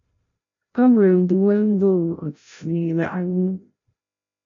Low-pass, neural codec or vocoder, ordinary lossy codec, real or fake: 7.2 kHz; codec, 16 kHz, 0.5 kbps, FreqCodec, larger model; MP3, 64 kbps; fake